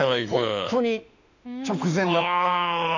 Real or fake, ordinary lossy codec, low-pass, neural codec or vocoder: fake; none; 7.2 kHz; autoencoder, 48 kHz, 32 numbers a frame, DAC-VAE, trained on Japanese speech